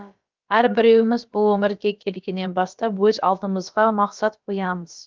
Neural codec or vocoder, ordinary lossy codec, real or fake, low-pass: codec, 16 kHz, about 1 kbps, DyCAST, with the encoder's durations; Opus, 32 kbps; fake; 7.2 kHz